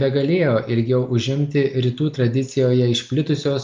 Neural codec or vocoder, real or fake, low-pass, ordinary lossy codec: none; real; 7.2 kHz; Opus, 24 kbps